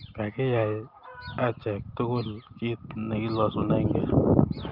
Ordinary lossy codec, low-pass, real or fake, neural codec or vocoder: Opus, 24 kbps; 5.4 kHz; real; none